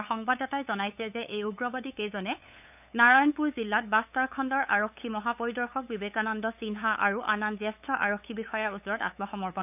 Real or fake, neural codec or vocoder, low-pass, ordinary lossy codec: fake; codec, 16 kHz, 8 kbps, FunCodec, trained on LibriTTS, 25 frames a second; 3.6 kHz; none